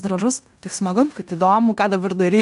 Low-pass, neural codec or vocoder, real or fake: 10.8 kHz; codec, 16 kHz in and 24 kHz out, 0.9 kbps, LongCat-Audio-Codec, fine tuned four codebook decoder; fake